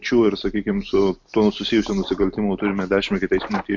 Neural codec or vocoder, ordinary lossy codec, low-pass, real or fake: none; MP3, 48 kbps; 7.2 kHz; real